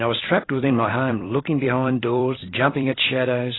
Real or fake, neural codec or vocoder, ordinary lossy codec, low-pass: fake; vocoder, 44.1 kHz, 128 mel bands every 256 samples, BigVGAN v2; AAC, 16 kbps; 7.2 kHz